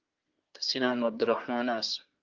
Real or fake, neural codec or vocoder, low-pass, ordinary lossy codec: fake; codec, 24 kHz, 1 kbps, SNAC; 7.2 kHz; Opus, 24 kbps